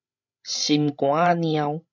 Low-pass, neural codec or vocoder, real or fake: 7.2 kHz; codec, 16 kHz, 16 kbps, FreqCodec, larger model; fake